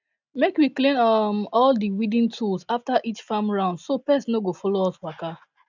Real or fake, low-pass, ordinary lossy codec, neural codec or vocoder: real; 7.2 kHz; none; none